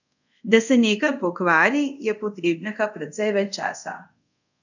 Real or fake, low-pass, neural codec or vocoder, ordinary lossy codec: fake; 7.2 kHz; codec, 24 kHz, 0.5 kbps, DualCodec; none